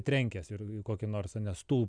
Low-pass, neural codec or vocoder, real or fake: 9.9 kHz; none; real